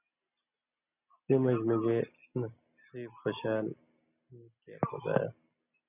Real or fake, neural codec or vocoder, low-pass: real; none; 3.6 kHz